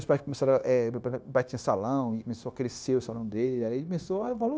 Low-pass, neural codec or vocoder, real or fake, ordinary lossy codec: none; codec, 16 kHz, 0.9 kbps, LongCat-Audio-Codec; fake; none